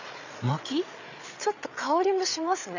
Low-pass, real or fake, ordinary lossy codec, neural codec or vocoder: 7.2 kHz; fake; none; codec, 16 kHz, 8 kbps, FreqCodec, larger model